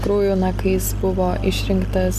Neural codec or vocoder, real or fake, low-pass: none; real; 14.4 kHz